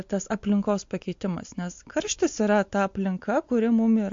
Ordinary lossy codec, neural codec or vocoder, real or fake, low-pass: MP3, 48 kbps; none; real; 7.2 kHz